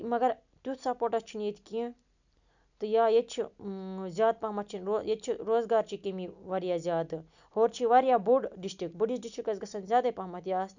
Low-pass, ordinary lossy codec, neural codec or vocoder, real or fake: 7.2 kHz; none; none; real